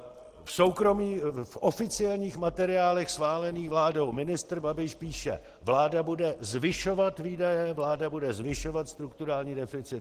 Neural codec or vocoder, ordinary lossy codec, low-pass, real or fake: none; Opus, 16 kbps; 14.4 kHz; real